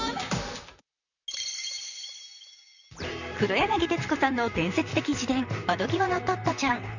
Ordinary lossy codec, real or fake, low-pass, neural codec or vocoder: none; fake; 7.2 kHz; vocoder, 44.1 kHz, 128 mel bands, Pupu-Vocoder